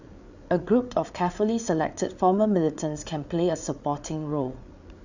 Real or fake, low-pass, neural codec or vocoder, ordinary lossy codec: fake; 7.2 kHz; codec, 16 kHz, 8 kbps, FreqCodec, larger model; none